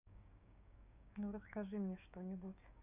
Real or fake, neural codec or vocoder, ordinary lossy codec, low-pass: fake; codec, 16 kHz, 6 kbps, DAC; none; 3.6 kHz